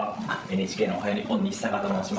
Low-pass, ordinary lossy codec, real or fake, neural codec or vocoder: none; none; fake; codec, 16 kHz, 16 kbps, FreqCodec, larger model